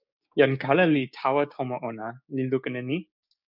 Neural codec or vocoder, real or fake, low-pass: codec, 16 kHz, 6 kbps, DAC; fake; 5.4 kHz